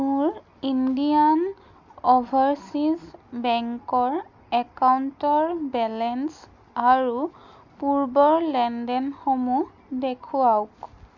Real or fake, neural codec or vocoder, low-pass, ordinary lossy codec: real; none; 7.2 kHz; none